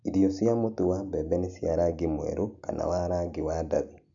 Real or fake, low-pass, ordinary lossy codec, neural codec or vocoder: real; 7.2 kHz; AAC, 64 kbps; none